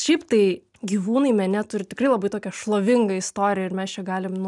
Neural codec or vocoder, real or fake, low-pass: none; real; 10.8 kHz